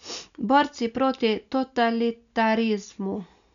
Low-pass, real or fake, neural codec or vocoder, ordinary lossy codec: 7.2 kHz; real; none; none